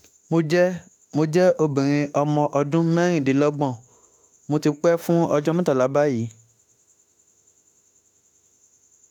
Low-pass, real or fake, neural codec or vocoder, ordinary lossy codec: 19.8 kHz; fake; autoencoder, 48 kHz, 32 numbers a frame, DAC-VAE, trained on Japanese speech; none